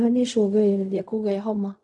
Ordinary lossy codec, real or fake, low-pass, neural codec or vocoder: none; fake; 10.8 kHz; codec, 16 kHz in and 24 kHz out, 0.4 kbps, LongCat-Audio-Codec, fine tuned four codebook decoder